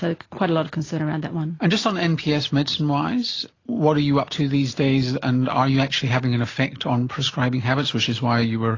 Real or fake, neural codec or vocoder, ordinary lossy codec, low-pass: real; none; AAC, 32 kbps; 7.2 kHz